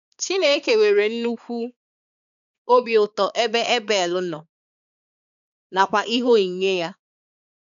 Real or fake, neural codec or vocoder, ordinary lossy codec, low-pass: fake; codec, 16 kHz, 4 kbps, X-Codec, HuBERT features, trained on balanced general audio; none; 7.2 kHz